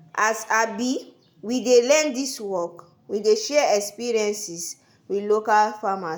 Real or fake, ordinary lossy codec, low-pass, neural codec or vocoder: real; none; none; none